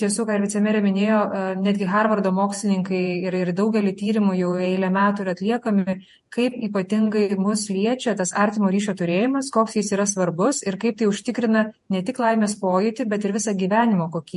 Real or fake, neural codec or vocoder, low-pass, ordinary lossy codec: fake; vocoder, 48 kHz, 128 mel bands, Vocos; 14.4 kHz; MP3, 48 kbps